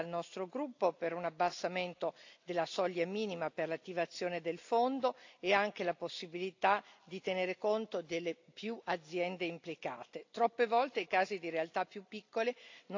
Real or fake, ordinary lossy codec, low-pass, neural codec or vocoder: real; AAC, 48 kbps; 7.2 kHz; none